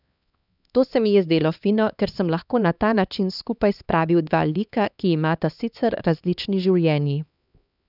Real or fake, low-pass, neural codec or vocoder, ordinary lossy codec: fake; 5.4 kHz; codec, 16 kHz, 2 kbps, X-Codec, HuBERT features, trained on LibriSpeech; none